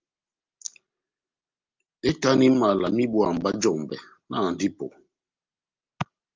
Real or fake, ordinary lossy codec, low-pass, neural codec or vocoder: real; Opus, 24 kbps; 7.2 kHz; none